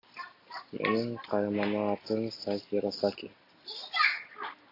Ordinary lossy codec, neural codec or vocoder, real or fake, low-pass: AAC, 32 kbps; none; real; 5.4 kHz